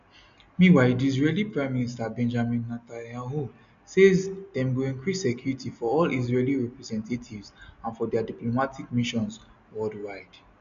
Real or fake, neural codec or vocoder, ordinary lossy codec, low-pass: real; none; none; 7.2 kHz